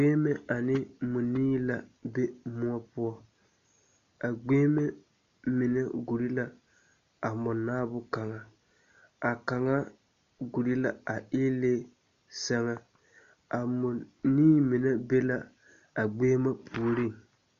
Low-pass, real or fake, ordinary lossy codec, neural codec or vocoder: 7.2 kHz; real; MP3, 48 kbps; none